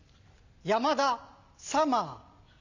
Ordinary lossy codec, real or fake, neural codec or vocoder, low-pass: none; real; none; 7.2 kHz